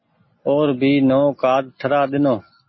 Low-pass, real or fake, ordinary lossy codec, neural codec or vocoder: 7.2 kHz; real; MP3, 24 kbps; none